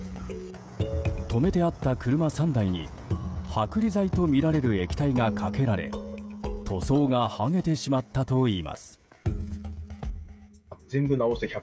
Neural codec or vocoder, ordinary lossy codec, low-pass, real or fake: codec, 16 kHz, 16 kbps, FreqCodec, smaller model; none; none; fake